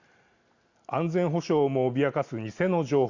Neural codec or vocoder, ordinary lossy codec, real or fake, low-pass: none; Opus, 64 kbps; real; 7.2 kHz